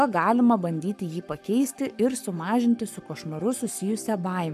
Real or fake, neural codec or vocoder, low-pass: fake; codec, 44.1 kHz, 7.8 kbps, Pupu-Codec; 14.4 kHz